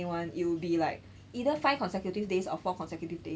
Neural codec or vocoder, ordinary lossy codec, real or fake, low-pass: none; none; real; none